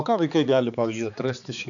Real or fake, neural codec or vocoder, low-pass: fake; codec, 16 kHz, 4 kbps, X-Codec, HuBERT features, trained on balanced general audio; 7.2 kHz